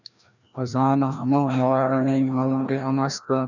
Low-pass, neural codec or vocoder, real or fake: 7.2 kHz; codec, 16 kHz, 1 kbps, FreqCodec, larger model; fake